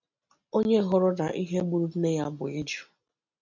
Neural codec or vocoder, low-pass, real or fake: none; 7.2 kHz; real